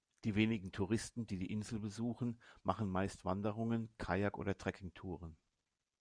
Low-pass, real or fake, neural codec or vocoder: 9.9 kHz; real; none